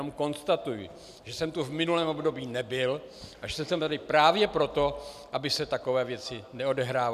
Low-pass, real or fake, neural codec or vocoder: 14.4 kHz; real; none